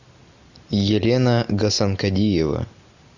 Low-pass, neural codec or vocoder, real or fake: 7.2 kHz; none; real